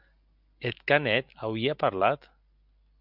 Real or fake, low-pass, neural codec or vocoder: real; 5.4 kHz; none